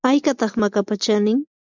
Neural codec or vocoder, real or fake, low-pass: none; real; 7.2 kHz